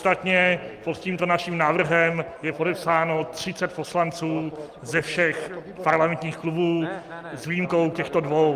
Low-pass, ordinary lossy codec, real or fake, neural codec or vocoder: 14.4 kHz; Opus, 32 kbps; real; none